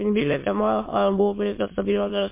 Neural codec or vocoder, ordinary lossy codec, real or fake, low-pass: autoencoder, 22.05 kHz, a latent of 192 numbers a frame, VITS, trained on many speakers; MP3, 24 kbps; fake; 3.6 kHz